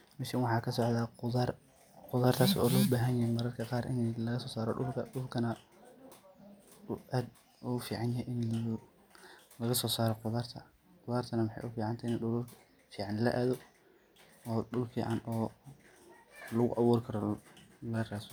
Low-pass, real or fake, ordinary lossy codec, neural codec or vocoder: none; real; none; none